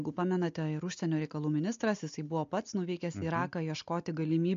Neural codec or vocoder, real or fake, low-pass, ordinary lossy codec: none; real; 7.2 kHz; MP3, 48 kbps